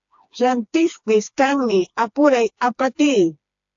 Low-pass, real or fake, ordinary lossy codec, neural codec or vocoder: 7.2 kHz; fake; AAC, 64 kbps; codec, 16 kHz, 2 kbps, FreqCodec, smaller model